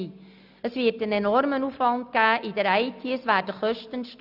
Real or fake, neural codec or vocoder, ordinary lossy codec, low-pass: real; none; none; 5.4 kHz